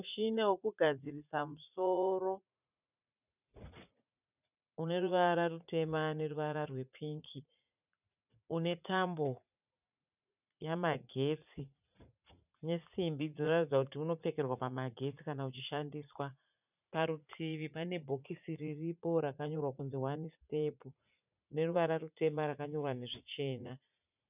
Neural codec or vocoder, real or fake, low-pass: vocoder, 44.1 kHz, 80 mel bands, Vocos; fake; 3.6 kHz